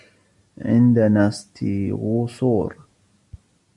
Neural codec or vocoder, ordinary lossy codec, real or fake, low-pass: none; MP3, 64 kbps; real; 10.8 kHz